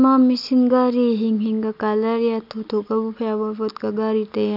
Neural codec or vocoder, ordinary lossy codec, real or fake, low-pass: none; none; real; 5.4 kHz